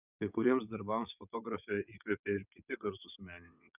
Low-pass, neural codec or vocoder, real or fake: 3.6 kHz; vocoder, 22.05 kHz, 80 mel bands, WaveNeXt; fake